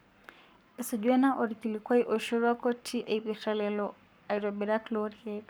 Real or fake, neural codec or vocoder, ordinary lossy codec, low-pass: fake; codec, 44.1 kHz, 7.8 kbps, Pupu-Codec; none; none